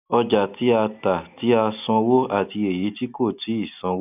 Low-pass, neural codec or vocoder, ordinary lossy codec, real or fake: 3.6 kHz; none; none; real